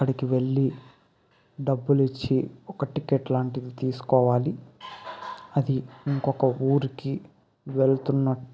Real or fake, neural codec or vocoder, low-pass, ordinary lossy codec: real; none; none; none